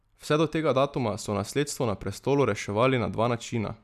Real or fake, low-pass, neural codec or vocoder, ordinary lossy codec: real; 14.4 kHz; none; none